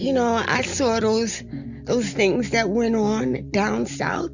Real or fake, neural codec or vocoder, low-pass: real; none; 7.2 kHz